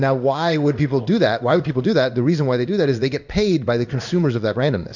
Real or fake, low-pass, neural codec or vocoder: fake; 7.2 kHz; codec, 16 kHz in and 24 kHz out, 1 kbps, XY-Tokenizer